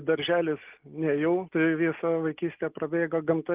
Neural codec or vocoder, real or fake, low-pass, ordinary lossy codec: none; real; 3.6 kHz; Opus, 32 kbps